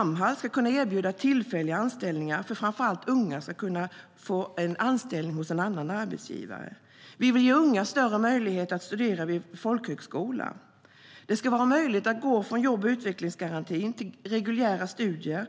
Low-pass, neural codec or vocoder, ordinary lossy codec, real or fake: none; none; none; real